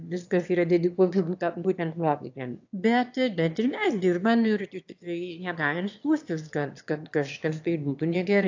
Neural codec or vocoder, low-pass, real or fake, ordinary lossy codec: autoencoder, 22.05 kHz, a latent of 192 numbers a frame, VITS, trained on one speaker; 7.2 kHz; fake; AAC, 48 kbps